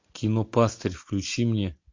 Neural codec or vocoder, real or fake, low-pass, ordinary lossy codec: none; real; 7.2 kHz; MP3, 48 kbps